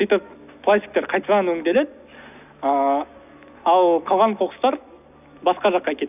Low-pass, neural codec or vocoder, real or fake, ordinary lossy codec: 3.6 kHz; none; real; none